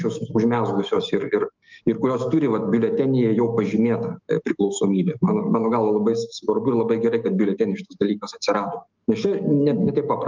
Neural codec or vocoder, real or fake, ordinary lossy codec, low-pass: autoencoder, 48 kHz, 128 numbers a frame, DAC-VAE, trained on Japanese speech; fake; Opus, 24 kbps; 7.2 kHz